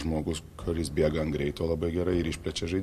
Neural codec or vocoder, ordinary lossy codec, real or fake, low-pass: none; MP3, 64 kbps; real; 14.4 kHz